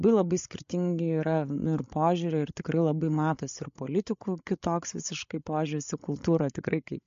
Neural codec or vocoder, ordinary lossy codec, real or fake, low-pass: codec, 16 kHz, 8 kbps, FreqCodec, larger model; MP3, 48 kbps; fake; 7.2 kHz